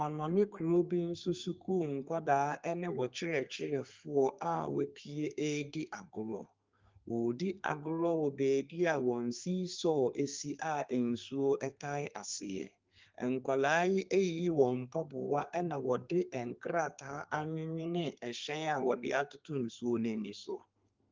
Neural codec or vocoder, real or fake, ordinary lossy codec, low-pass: codec, 32 kHz, 1.9 kbps, SNAC; fake; Opus, 32 kbps; 7.2 kHz